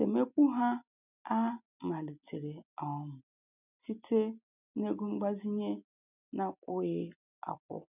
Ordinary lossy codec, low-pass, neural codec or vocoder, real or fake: none; 3.6 kHz; none; real